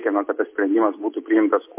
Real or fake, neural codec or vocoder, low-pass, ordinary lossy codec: real; none; 3.6 kHz; MP3, 24 kbps